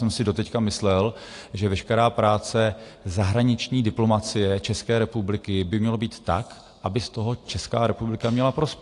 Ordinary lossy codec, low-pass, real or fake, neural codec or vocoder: AAC, 48 kbps; 10.8 kHz; real; none